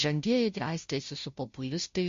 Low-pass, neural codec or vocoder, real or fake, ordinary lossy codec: 7.2 kHz; codec, 16 kHz, 0.5 kbps, FunCodec, trained on Chinese and English, 25 frames a second; fake; MP3, 48 kbps